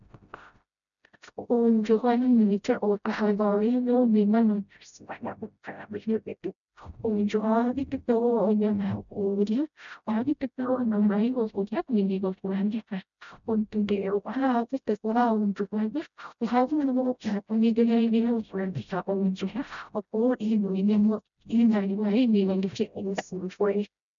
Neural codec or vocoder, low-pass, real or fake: codec, 16 kHz, 0.5 kbps, FreqCodec, smaller model; 7.2 kHz; fake